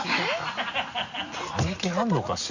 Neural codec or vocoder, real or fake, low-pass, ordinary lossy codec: none; real; 7.2 kHz; Opus, 64 kbps